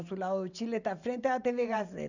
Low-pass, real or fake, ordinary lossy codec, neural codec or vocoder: 7.2 kHz; fake; none; vocoder, 44.1 kHz, 128 mel bands every 512 samples, BigVGAN v2